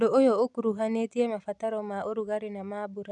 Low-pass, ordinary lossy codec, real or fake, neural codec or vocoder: 10.8 kHz; none; real; none